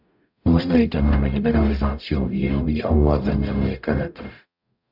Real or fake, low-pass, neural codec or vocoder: fake; 5.4 kHz; codec, 44.1 kHz, 0.9 kbps, DAC